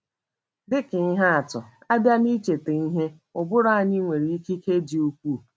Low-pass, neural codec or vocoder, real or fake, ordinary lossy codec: none; none; real; none